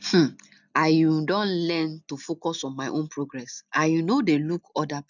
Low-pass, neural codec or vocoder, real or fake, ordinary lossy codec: 7.2 kHz; none; real; none